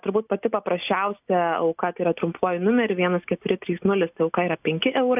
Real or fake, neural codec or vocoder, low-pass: real; none; 3.6 kHz